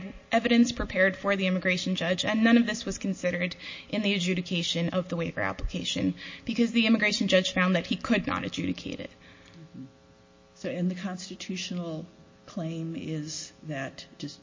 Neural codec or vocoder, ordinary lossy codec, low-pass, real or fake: none; MP3, 32 kbps; 7.2 kHz; real